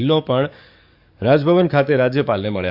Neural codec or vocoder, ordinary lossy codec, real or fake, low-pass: codec, 44.1 kHz, 7.8 kbps, DAC; AAC, 48 kbps; fake; 5.4 kHz